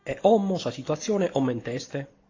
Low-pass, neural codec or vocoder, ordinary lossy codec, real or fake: 7.2 kHz; none; AAC, 32 kbps; real